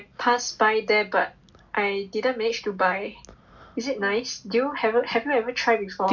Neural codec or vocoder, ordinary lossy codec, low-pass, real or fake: none; none; 7.2 kHz; real